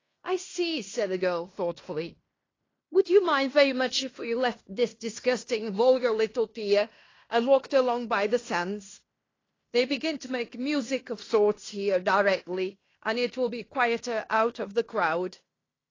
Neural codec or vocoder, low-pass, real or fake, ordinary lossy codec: codec, 16 kHz in and 24 kHz out, 0.9 kbps, LongCat-Audio-Codec, fine tuned four codebook decoder; 7.2 kHz; fake; AAC, 32 kbps